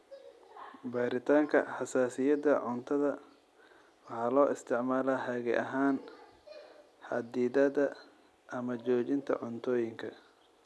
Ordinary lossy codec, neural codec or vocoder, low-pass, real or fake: none; none; none; real